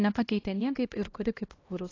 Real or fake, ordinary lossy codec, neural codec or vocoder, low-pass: fake; AAC, 32 kbps; codec, 16 kHz, 1 kbps, X-Codec, HuBERT features, trained on LibriSpeech; 7.2 kHz